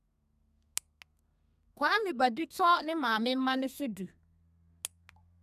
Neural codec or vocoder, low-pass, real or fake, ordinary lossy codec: codec, 32 kHz, 1.9 kbps, SNAC; 14.4 kHz; fake; none